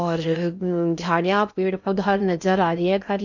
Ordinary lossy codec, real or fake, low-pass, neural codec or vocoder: none; fake; 7.2 kHz; codec, 16 kHz in and 24 kHz out, 0.6 kbps, FocalCodec, streaming, 2048 codes